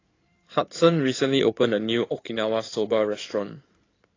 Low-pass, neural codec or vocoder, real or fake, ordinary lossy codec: 7.2 kHz; codec, 16 kHz in and 24 kHz out, 2.2 kbps, FireRedTTS-2 codec; fake; AAC, 32 kbps